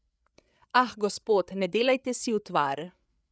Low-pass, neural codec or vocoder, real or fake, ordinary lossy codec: none; codec, 16 kHz, 8 kbps, FreqCodec, larger model; fake; none